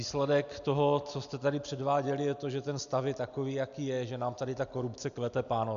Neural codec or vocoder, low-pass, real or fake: none; 7.2 kHz; real